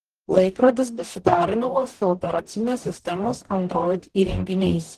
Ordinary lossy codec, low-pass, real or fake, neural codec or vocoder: Opus, 16 kbps; 14.4 kHz; fake; codec, 44.1 kHz, 0.9 kbps, DAC